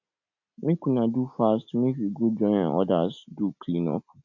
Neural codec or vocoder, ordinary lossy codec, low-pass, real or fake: none; none; 7.2 kHz; real